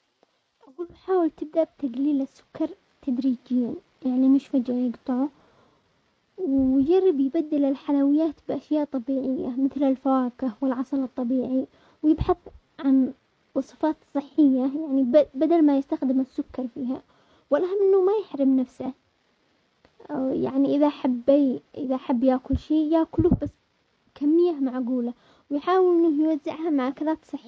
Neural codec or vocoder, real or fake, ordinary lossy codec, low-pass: none; real; none; none